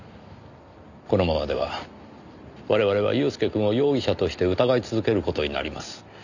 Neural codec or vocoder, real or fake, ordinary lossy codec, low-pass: none; real; none; 7.2 kHz